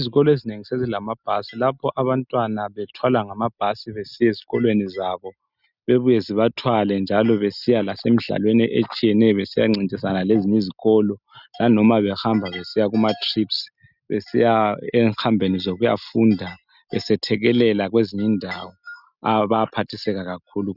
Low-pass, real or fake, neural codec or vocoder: 5.4 kHz; real; none